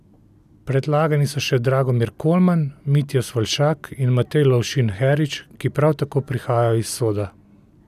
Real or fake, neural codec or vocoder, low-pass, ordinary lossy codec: real; none; 14.4 kHz; none